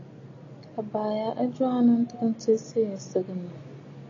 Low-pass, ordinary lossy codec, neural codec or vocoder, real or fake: 7.2 kHz; AAC, 32 kbps; none; real